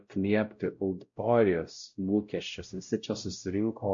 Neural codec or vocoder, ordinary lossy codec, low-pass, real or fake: codec, 16 kHz, 0.5 kbps, X-Codec, WavLM features, trained on Multilingual LibriSpeech; MP3, 48 kbps; 7.2 kHz; fake